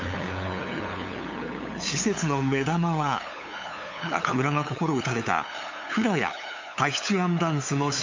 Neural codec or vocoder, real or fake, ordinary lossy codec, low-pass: codec, 16 kHz, 8 kbps, FunCodec, trained on LibriTTS, 25 frames a second; fake; MP3, 48 kbps; 7.2 kHz